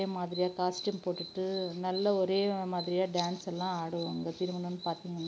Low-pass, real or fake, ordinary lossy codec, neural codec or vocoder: none; real; none; none